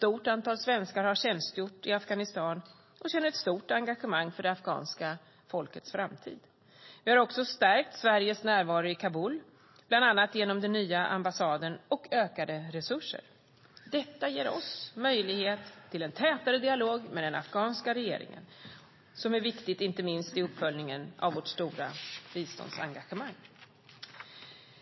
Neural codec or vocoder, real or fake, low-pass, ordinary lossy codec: none; real; 7.2 kHz; MP3, 24 kbps